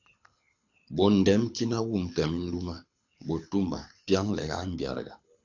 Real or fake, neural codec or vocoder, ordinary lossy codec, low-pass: fake; codec, 24 kHz, 6 kbps, HILCodec; AAC, 48 kbps; 7.2 kHz